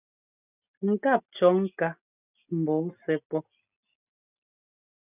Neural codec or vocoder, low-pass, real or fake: none; 3.6 kHz; real